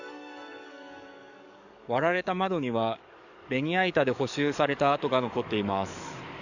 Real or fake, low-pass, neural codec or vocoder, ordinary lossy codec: fake; 7.2 kHz; codec, 44.1 kHz, 7.8 kbps, DAC; none